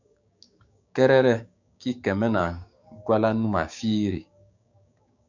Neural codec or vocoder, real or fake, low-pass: codec, 16 kHz, 6 kbps, DAC; fake; 7.2 kHz